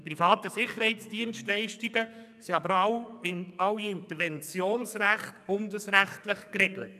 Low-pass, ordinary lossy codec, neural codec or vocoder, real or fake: 14.4 kHz; none; codec, 44.1 kHz, 2.6 kbps, SNAC; fake